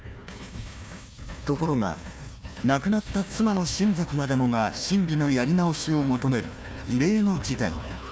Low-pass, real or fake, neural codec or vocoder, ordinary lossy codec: none; fake; codec, 16 kHz, 1 kbps, FunCodec, trained on Chinese and English, 50 frames a second; none